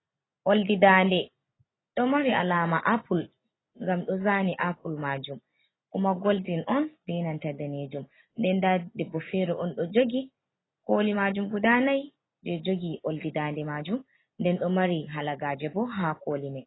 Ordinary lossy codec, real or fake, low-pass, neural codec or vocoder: AAC, 16 kbps; real; 7.2 kHz; none